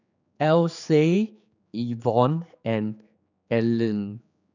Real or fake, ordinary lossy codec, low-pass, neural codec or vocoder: fake; none; 7.2 kHz; codec, 16 kHz, 4 kbps, X-Codec, HuBERT features, trained on general audio